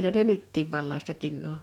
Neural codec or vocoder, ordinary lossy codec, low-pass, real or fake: codec, 44.1 kHz, 2.6 kbps, DAC; none; 19.8 kHz; fake